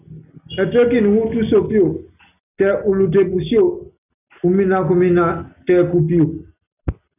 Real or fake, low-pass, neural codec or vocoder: real; 3.6 kHz; none